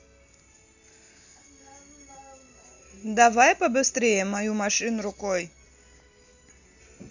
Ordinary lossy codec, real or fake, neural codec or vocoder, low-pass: none; real; none; 7.2 kHz